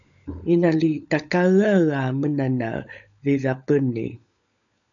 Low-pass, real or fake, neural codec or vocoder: 7.2 kHz; fake; codec, 16 kHz, 16 kbps, FunCodec, trained on LibriTTS, 50 frames a second